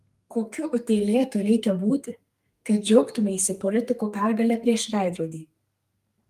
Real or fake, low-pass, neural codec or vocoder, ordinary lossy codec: fake; 14.4 kHz; codec, 32 kHz, 1.9 kbps, SNAC; Opus, 32 kbps